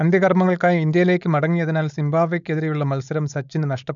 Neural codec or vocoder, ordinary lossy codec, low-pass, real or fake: codec, 16 kHz, 4.8 kbps, FACodec; none; 7.2 kHz; fake